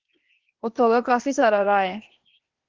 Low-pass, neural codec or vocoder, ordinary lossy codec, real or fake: 7.2 kHz; codec, 16 kHz, 0.8 kbps, ZipCodec; Opus, 16 kbps; fake